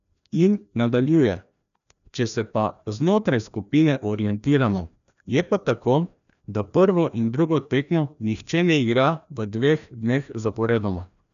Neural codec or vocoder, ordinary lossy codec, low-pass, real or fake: codec, 16 kHz, 1 kbps, FreqCodec, larger model; none; 7.2 kHz; fake